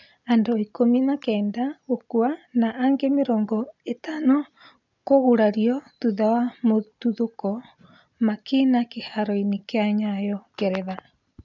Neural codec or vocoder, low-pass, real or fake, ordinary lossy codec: none; 7.2 kHz; real; none